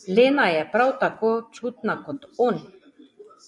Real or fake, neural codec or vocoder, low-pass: fake; vocoder, 24 kHz, 100 mel bands, Vocos; 10.8 kHz